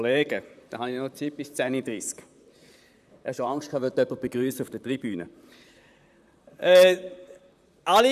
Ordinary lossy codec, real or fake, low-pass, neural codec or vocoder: none; fake; 14.4 kHz; vocoder, 44.1 kHz, 128 mel bands, Pupu-Vocoder